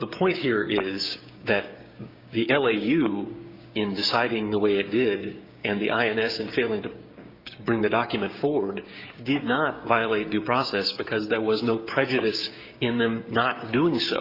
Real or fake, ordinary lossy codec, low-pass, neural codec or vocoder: fake; Opus, 64 kbps; 5.4 kHz; codec, 16 kHz, 6 kbps, DAC